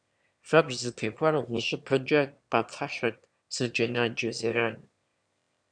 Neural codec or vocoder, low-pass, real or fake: autoencoder, 22.05 kHz, a latent of 192 numbers a frame, VITS, trained on one speaker; 9.9 kHz; fake